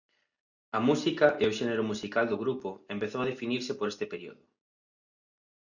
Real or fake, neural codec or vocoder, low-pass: real; none; 7.2 kHz